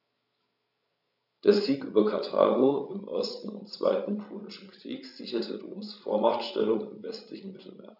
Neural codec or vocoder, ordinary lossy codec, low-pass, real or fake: codec, 16 kHz, 16 kbps, FreqCodec, larger model; none; 5.4 kHz; fake